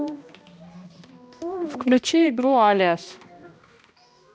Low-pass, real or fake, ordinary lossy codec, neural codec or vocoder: none; fake; none; codec, 16 kHz, 1 kbps, X-Codec, HuBERT features, trained on balanced general audio